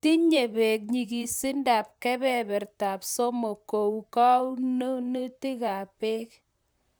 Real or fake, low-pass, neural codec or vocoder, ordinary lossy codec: fake; none; vocoder, 44.1 kHz, 128 mel bands, Pupu-Vocoder; none